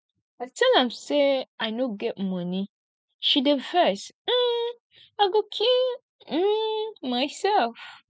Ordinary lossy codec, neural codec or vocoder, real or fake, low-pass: none; none; real; none